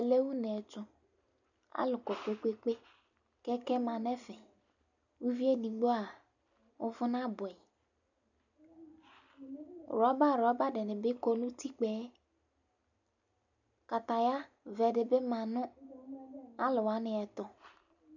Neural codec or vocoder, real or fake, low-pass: none; real; 7.2 kHz